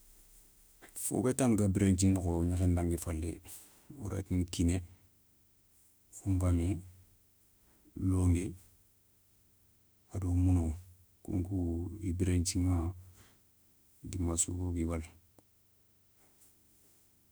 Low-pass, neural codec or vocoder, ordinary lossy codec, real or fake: none; autoencoder, 48 kHz, 32 numbers a frame, DAC-VAE, trained on Japanese speech; none; fake